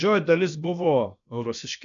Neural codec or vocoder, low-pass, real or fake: codec, 16 kHz, about 1 kbps, DyCAST, with the encoder's durations; 7.2 kHz; fake